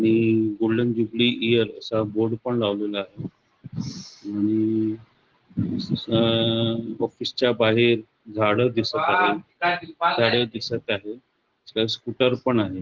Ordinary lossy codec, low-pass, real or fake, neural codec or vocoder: Opus, 16 kbps; 7.2 kHz; real; none